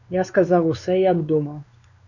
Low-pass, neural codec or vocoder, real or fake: 7.2 kHz; codec, 16 kHz, 2 kbps, X-Codec, WavLM features, trained on Multilingual LibriSpeech; fake